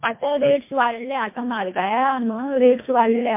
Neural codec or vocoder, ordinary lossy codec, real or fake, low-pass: codec, 24 kHz, 1.5 kbps, HILCodec; MP3, 24 kbps; fake; 3.6 kHz